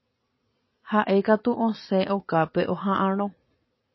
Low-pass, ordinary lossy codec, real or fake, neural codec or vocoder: 7.2 kHz; MP3, 24 kbps; fake; vocoder, 44.1 kHz, 80 mel bands, Vocos